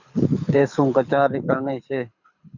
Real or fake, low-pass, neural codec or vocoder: fake; 7.2 kHz; codec, 24 kHz, 6 kbps, HILCodec